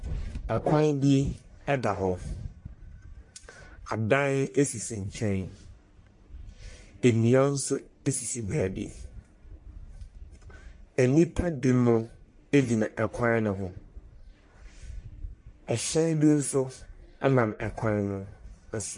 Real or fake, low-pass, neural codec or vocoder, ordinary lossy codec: fake; 10.8 kHz; codec, 44.1 kHz, 1.7 kbps, Pupu-Codec; MP3, 48 kbps